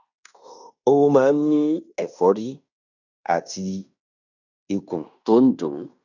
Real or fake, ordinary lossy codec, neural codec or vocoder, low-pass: fake; none; codec, 16 kHz in and 24 kHz out, 0.9 kbps, LongCat-Audio-Codec, fine tuned four codebook decoder; 7.2 kHz